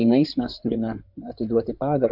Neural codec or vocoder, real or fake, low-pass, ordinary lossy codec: codec, 16 kHz in and 24 kHz out, 2.2 kbps, FireRedTTS-2 codec; fake; 5.4 kHz; AAC, 48 kbps